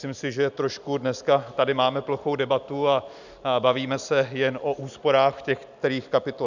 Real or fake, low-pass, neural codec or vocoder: real; 7.2 kHz; none